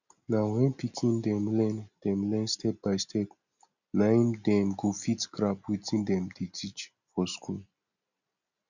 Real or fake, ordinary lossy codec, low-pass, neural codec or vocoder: real; none; 7.2 kHz; none